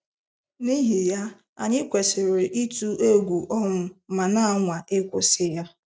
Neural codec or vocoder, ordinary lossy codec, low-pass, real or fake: none; none; none; real